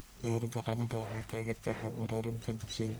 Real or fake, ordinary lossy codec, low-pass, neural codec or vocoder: fake; none; none; codec, 44.1 kHz, 1.7 kbps, Pupu-Codec